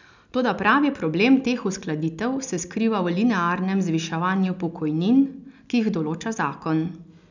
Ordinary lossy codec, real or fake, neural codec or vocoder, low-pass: none; real; none; 7.2 kHz